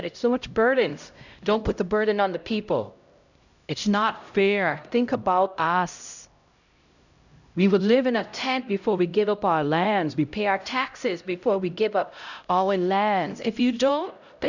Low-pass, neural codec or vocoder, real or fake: 7.2 kHz; codec, 16 kHz, 0.5 kbps, X-Codec, HuBERT features, trained on LibriSpeech; fake